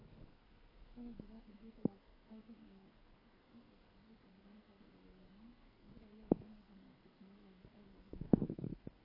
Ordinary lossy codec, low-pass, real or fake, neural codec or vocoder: AAC, 24 kbps; 5.4 kHz; fake; codec, 44.1 kHz, 2.6 kbps, SNAC